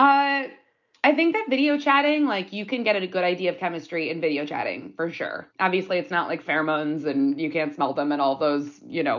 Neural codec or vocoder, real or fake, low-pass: none; real; 7.2 kHz